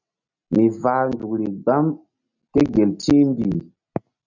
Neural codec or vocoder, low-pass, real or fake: none; 7.2 kHz; real